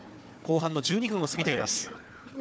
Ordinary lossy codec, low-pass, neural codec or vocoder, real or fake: none; none; codec, 16 kHz, 4 kbps, FunCodec, trained on Chinese and English, 50 frames a second; fake